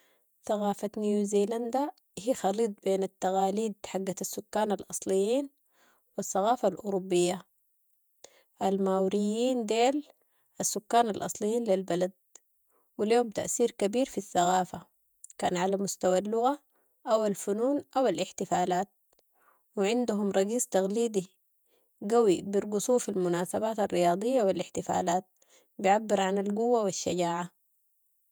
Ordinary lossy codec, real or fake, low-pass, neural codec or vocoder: none; fake; none; vocoder, 48 kHz, 128 mel bands, Vocos